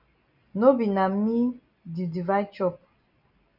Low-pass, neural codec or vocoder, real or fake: 5.4 kHz; none; real